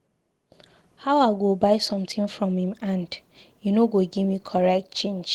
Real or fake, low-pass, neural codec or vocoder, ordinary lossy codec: real; 19.8 kHz; none; Opus, 16 kbps